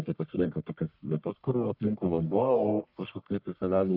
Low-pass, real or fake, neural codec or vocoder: 5.4 kHz; fake; codec, 44.1 kHz, 1.7 kbps, Pupu-Codec